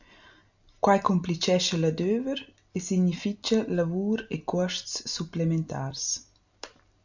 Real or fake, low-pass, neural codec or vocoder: real; 7.2 kHz; none